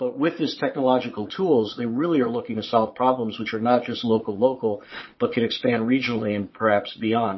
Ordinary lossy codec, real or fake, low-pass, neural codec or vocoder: MP3, 24 kbps; fake; 7.2 kHz; vocoder, 22.05 kHz, 80 mel bands, Vocos